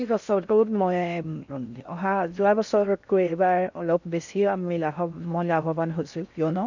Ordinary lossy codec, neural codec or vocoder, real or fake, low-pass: none; codec, 16 kHz in and 24 kHz out, 0.6 kbps, FocalCodec, streaming, 4096 codes; fake; 7.2 kHz